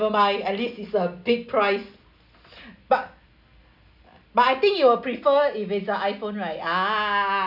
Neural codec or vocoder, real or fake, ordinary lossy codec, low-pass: none; real; none; 5.4 kHz